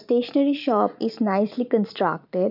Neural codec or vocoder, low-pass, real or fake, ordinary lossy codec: vocoder, 44.1 kHz, 128 mel bands every 512 samples, BigVGAN v2; 5.4 kHz; fake; none